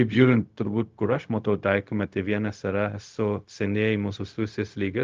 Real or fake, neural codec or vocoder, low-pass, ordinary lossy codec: fake; codec, 16 kHz, 0.4 kbps, LongCat-Audio-Codec; 7.2 kHz; Opus, 24 kbps